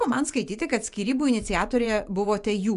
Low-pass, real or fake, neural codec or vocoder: 10.8 kHz; fake; vocoder, 24 kHz, 100 mel bands, Vocos